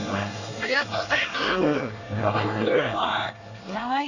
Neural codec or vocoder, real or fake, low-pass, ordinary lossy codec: codec, 24 kHz, 1 kbps, SNAC; fake; 7.2 kHz; none